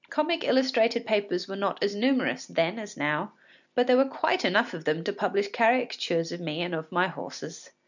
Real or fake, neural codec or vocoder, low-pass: real; none; 7.2 kHz